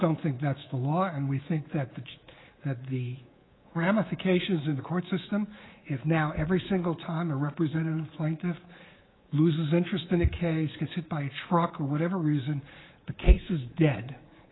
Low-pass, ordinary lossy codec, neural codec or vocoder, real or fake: 7.2 kHz; AAC, 16 kbps; codec, 24 kHz, 3.1 kbps, DualCodec; fake